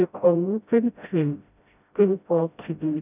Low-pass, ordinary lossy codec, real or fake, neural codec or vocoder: 3.6 kHz; none; fake; codec, 16 kHz, 0.5 kbps, FreqCodec, smaller model